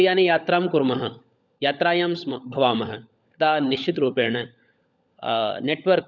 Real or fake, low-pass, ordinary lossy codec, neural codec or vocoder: fake; 7.2 kHz; none; codec, 16 kHz, 16 kbps, FunCodec, trained on LibriTTS, 50 frames a second